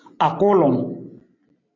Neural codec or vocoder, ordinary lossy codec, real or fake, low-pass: none; AAC, 32 kbps; real; 7.2 kHz